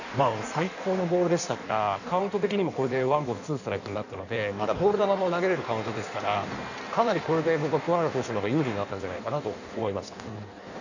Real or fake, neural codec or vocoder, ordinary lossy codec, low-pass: fake; codec, 16 kHz in and 24 kHz out, 1.1 kbps, FireRedTTS-2 codec; none; 7.2 kHz